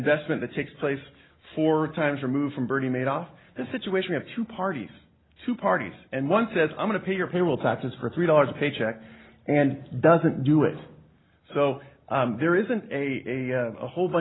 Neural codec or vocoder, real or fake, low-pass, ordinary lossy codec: none; real; 7.2 kHz; AAC, 16 kbps